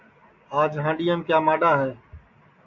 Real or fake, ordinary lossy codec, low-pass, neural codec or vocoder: real; MP3, 48 kbps; 7.2 kHz; none